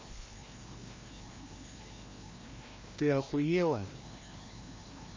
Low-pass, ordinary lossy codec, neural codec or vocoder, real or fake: 7.2 kHz; MP3, 32 kbps; codec, 16 kHz, 1 kbps, FreqCodec, larger model; fake